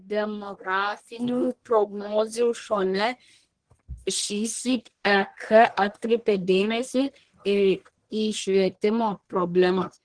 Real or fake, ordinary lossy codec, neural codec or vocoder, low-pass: fake; Opus, 16 kbps; codec, 44.1 kHz, 1.7 kbps, Pupu-Codec; 9.9 kHz